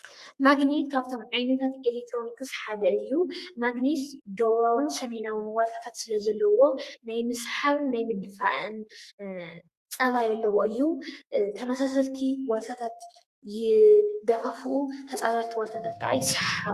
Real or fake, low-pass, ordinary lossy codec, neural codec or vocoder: fake; 14.4 kHz; Opus, 64 kbps; codec, 32 kHz, 1.9 kbps, SNAC